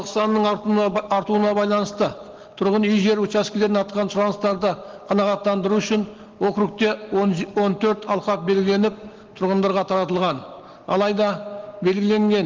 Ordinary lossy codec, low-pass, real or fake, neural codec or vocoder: Opus, 16 kbps; 7.2 kHz; real; none